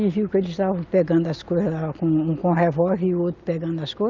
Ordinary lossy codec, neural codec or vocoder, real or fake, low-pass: Opus, 32 kbps; none; real; 7.2 kHz